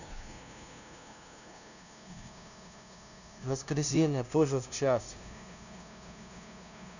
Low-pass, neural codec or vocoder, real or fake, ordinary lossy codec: 7.2 kHz; codec, 16 kHz, 0.5 kbps, FunCodec, trained on LibriTTS, 25 frames a second; fake; none